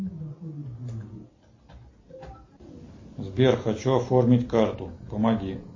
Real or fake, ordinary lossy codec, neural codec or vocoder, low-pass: real; MP3, 32 kbps; none; 7.2 kHz